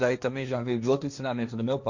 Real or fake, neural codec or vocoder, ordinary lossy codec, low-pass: fake; codec, 16 kHz, 1.1 kbps, Voila-Tokenizer; none; 7.2 kHz